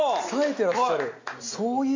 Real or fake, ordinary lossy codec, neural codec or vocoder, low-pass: real; AAC, 48 kbps; none; 7.2 kHz